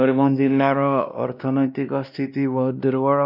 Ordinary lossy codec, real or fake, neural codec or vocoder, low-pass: none; fake; codec, 16 kHz, 1 kbps, X-Codec, WavLM features, trained on Multilingual LibriSpeech; 5.4 kHz